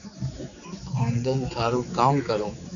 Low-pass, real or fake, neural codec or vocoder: 7.2 kHz; fake; codec, 16 kHz, 4 kbps, X-Codec, HuBERT features, trained on general audio